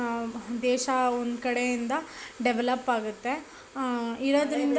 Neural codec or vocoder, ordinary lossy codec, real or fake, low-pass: none; none; real; none